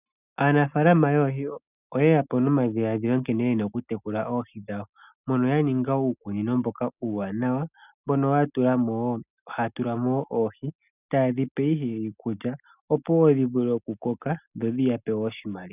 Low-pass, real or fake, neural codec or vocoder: 3.6 kHz; real; none